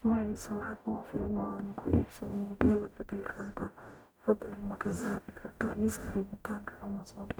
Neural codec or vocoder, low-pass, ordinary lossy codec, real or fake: codec, 44.1 kHz, 0.9 kbps, DAC; none; none; fake